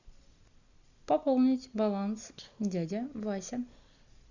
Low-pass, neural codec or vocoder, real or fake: 7.2 kHz; none; real